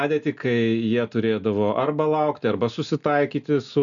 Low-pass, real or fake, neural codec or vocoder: 7.2 kHz; real; none